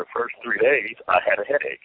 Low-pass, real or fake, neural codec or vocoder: 5.4 kHz; real; none